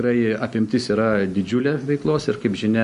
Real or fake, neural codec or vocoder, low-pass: real; none; 10.8 kHz